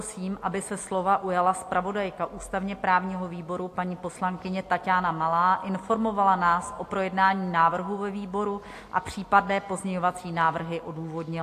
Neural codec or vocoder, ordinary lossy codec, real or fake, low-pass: none; AAC, 64 kbps; real; 14.4 kHz